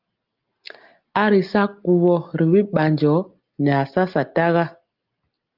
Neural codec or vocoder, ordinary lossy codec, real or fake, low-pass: none; Opus, 32 kbps; real; 5.4 kHz